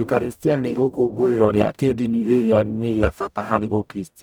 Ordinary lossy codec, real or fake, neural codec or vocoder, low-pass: none; fake; codec, 44.1 kHz, 0.9 kbps, DAC; none